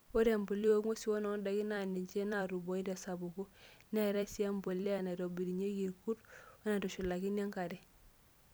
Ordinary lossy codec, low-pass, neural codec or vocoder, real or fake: none; none; vocoder, 44.1 kHz, 128 mel bands every 256 samples, BigVGAN v2; fake